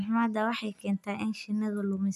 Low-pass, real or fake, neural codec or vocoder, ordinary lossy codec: 14.4 kHz; real; none; none